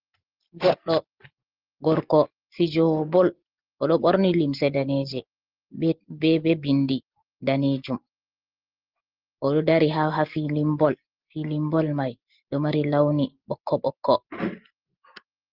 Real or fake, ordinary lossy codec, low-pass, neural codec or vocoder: real; Opus, 16 kbps; 5.4 kHz; none